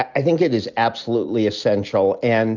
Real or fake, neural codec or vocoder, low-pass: real; none; 7.2 kHz